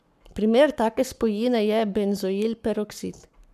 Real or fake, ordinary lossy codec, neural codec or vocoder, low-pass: fake; none; codec, 44.1 kHz, 7.8 kbps, Pupu-Codec; 14.4 kHz